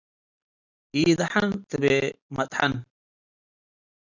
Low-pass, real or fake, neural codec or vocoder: 7.2 kHz; real; none